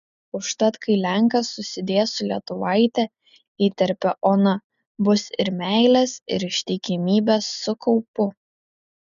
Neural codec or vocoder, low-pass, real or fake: none; 7.2 kHz; real